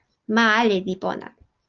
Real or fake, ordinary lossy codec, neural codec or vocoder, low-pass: real; Opus, 24 kbps; none; 7.2 kHz